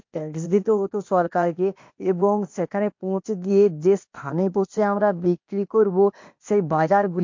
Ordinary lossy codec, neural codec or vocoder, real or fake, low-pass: MP3, 48 kbps; codec, 16 kHz, 0.8 kbps, ZipCodec; fake; 7.2 kHz